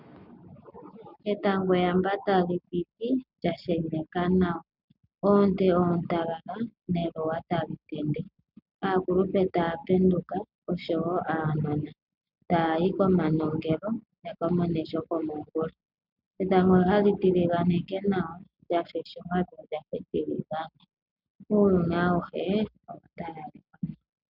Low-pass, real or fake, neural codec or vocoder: 5.4 kHz; real; none